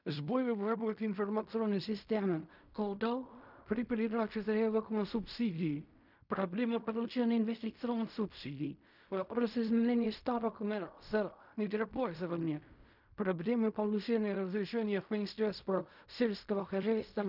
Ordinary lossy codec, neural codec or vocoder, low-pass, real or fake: none; codec, 16 kHz in and 24 kHz out, 0.4 kbps, LongCat-Audio-Codec, fine tuned four codebook decoder; 5.4 kHz; fake